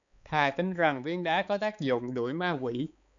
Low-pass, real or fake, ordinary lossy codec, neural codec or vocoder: 7.2 kHz; fake; MP3, 96 kbps; codec, 16 kHz, 4 kbps, X-Codec, HuBERT features, trained on balanced general audio